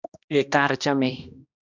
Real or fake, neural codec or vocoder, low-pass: fake; codec, 16 kHz, 1 kbps, X-Codec, HuBERT features, trained on balanced general audio; 7.2 kHz